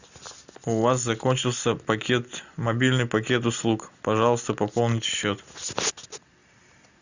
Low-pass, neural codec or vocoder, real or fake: 7.2 kHz; none; real